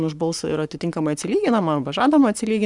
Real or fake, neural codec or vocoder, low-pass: fake; codec, 44.1 kHz, 7.8 kbps, Pupu-Codec; 10.8 kHz